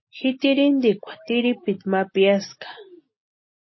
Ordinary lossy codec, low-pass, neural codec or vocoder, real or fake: MP3, 24 kbps; 7.2 kHz; none; real